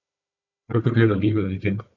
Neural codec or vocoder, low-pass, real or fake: codec, 16 kHz, 4 kbps, FunCodec, trained on Chinese and English, 50 frames a second; 7.2 kHz; fake